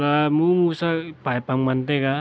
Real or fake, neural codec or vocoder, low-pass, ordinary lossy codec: real; none; none; none